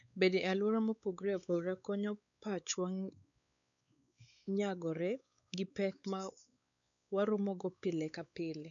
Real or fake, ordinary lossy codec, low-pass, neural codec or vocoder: fake; none; 7.2 kHz; codec, 16 kHz, 4 kbps, X-Codec, WavLM features, trained on Multilingual LibriSpeech